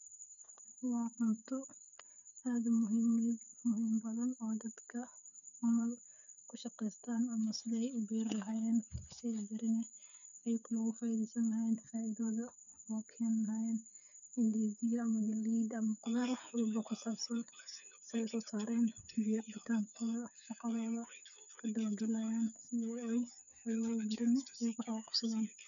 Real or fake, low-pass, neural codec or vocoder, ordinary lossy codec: fake; 7.2 kHz; codec, 16 kHz, 8 kbps, FreqCodec, smaller model; none